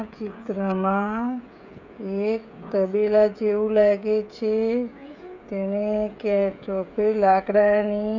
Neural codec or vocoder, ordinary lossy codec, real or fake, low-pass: codec, 16 kHz, 16 kbps, FreqCodec, smaller model; none; fake; 7.2 kHz